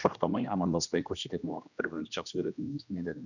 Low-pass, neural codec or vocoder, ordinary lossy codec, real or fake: 7.2 kHz; codec, 16 kHz, 1 kbps, X-Codec, HuBERT features, trained on general audio; none; fake